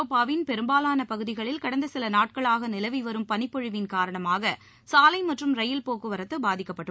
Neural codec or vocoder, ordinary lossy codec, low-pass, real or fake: none; none; none; real